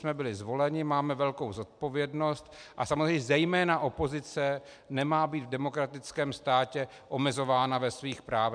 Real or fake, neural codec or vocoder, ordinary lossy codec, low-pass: real; none; MP3, 96 kbps; 9.9 kHz